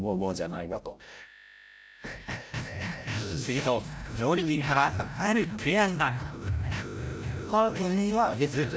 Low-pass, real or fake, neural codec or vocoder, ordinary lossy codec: none; fake; codec, 16 kHz, 0.5 kbps, FreqCodec, larger model; none